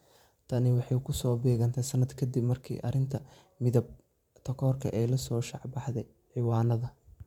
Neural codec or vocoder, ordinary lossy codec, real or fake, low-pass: vocoder, 44.1 kHz, 128 mel bands every 512 samples, BigVGAN v2; MP3, 96 kbps; fake; 19.8 kHz